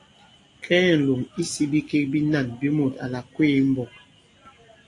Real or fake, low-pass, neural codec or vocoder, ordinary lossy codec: real; 10.8 kHz; none; AAC, 48 kbps